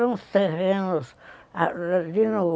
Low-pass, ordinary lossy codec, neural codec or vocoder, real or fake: none; none; none; real